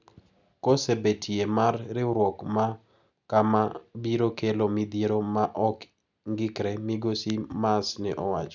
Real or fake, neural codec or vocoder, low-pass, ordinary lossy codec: real; none; 7.2 kHz; none